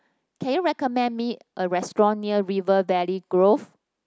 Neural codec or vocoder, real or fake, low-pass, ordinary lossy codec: none; real; none; none